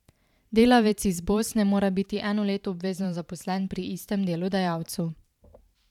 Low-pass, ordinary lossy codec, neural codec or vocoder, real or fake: 19.8 kHz; none; vocoder, 44.1 kHz, 128 mel bands every 512 samples, BigVGAN v2; fake